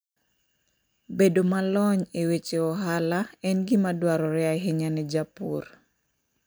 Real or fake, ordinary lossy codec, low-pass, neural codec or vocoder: real; none; none; none